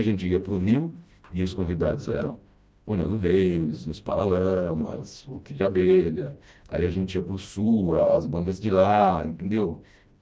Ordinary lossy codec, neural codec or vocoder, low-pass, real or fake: none; codec, 16 kHz, 1 kbps, FreqCodec, smaller model; none; fake